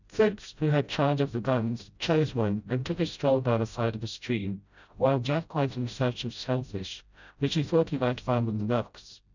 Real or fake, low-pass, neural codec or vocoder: fake; 7.2 kHz; codec, 16 kHz, 0.5 kbps, FreqCodec, smaller model